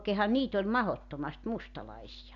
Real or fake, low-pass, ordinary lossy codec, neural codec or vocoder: real; 7.2 kHz; none; none